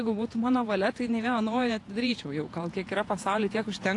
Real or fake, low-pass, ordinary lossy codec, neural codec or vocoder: fake; 10.8 kHz; AAC, 48 kbps; vocoder, 48 kHz, 128 mel bands, Vocos